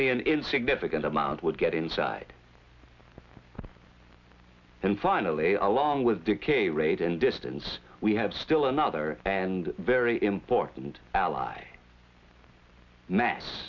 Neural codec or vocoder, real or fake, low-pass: none; real; 7.2 kHz